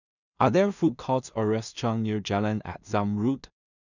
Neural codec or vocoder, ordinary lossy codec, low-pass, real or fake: codec, 16 kHz in and 24 kHz out, 0.4 kbps, LongCat-Audio-Codec, two codebook decoder; none; 7.2 kHz; fake